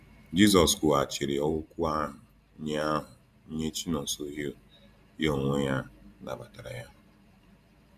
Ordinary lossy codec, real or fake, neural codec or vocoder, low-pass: none; fake; vocoder, 44.1 kHz, 128 mel bands every 512 samples, BigVGAN v2; 14.4 kHz